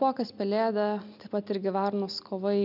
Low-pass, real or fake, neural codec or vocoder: 5.4 kHz; real; none